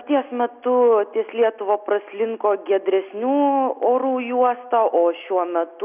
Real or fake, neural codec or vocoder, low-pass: real; none; 3.6 kHz